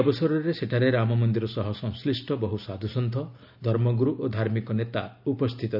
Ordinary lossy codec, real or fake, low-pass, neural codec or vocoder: none; real; 5.4 kHz; none